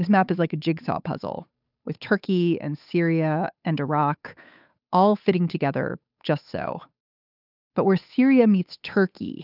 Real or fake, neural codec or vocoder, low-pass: fake; codec, 16 kHz, 8 kbps, FunCodec, trained on Chinese and English, 25 frames a second; 5.4 kHz